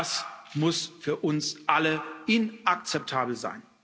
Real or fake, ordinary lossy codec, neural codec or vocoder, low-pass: real; none; none; none